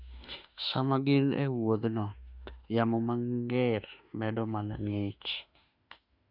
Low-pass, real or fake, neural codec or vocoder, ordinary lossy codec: 5.4 kHz; fake; autoencoder, 48 kHz, 32 numbers a frame, DAC-VAE, trained on Japanese speech; none